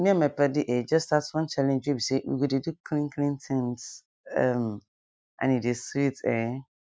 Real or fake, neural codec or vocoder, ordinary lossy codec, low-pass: real; none; none; none